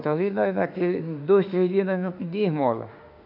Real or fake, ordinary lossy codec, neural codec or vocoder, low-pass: fake; MP3, 48 kbps; autoencoder, 48 kHz, 32 numbers a frame, DAC-VAE, trained on Japanese speech; 5.4 kHz